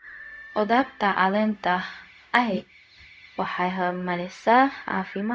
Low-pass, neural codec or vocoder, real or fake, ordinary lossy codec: none; codec, 16 kHz, 0.4 kbps, LongCat-Audio-Codec; fake; none